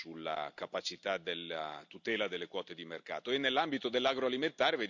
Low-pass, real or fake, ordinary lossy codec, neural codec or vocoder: 7.2 kHz; real; none; none